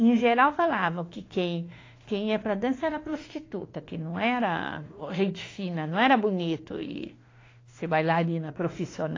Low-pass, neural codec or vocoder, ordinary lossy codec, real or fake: 7.2 kHz; autoencoder, 48 kHz, 32 numbers a frame, DAC-VAE, trained on Japanese speech; AAC, 32 kbps; fake